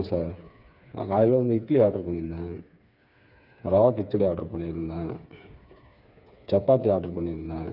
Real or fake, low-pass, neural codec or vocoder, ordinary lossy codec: fake; 5.4 kHz; codec, 16 kHz, 4 kbps, FreqCodec, smaller model; none